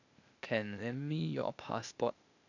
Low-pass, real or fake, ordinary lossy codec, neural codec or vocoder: 7.2 kHz; fake; none; codec, 16 kHz, 0.8 kbps, ZipCodec